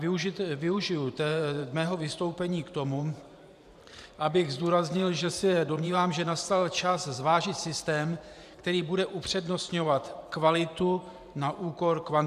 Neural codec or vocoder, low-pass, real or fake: vocoder, 44.1 kHz, 128 mel bands every 512 samples, BigVGAN v2; 14.4 kHz; fake